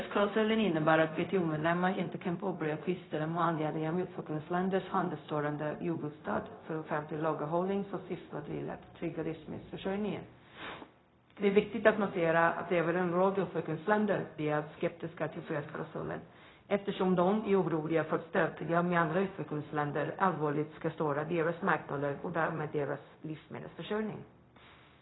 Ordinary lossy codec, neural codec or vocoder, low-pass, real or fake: AAC, 16 kbps; codec, 16 kHz, 0.4 kbps, LongCat-Audio-Codec; 7.2 kHz; fake